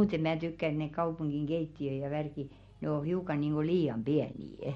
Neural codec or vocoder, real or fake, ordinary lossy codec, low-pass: none; real; MP3, 48 kbps; 19.8 kHz